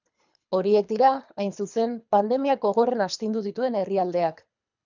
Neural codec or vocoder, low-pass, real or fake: codec, 24 kHz, 3 kbps, HILCodec; 7.2 kHz; fake